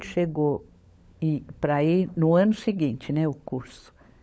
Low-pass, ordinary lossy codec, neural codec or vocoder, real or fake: none; none; codec, 16 kHz, 16 kbps, FunCodec, trained on LibriTTS, 50 frames a second; fake